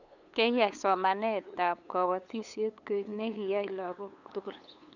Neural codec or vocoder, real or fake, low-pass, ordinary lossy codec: codec, 16 kHz, 8 kbps, FunCodec, trained on LibriTTS, 25 frames a second; fake; 7.2 kHz; none